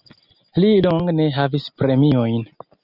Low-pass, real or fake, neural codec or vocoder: 5.4 kHz; real; none